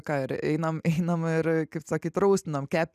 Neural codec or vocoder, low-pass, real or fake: none; 14.4 kHz; real